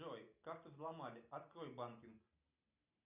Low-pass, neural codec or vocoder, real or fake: 3.6 kHz; none; real